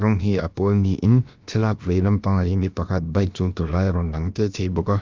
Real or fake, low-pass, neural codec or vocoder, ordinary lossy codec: fake; 7.2 kHz; codec, 16 kHz, about 1 kbps, DyCAST, with the encoder's durations; Opus, 32 kbps